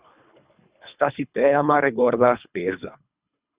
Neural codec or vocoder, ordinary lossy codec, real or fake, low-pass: codec, 24 kHz, 3 kbps, HILCodec; Opus, 64 kbps; fake; 3.6 kHz